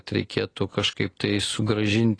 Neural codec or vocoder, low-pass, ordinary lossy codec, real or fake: none; 9.9 kHz; AAC, 32 kbps; real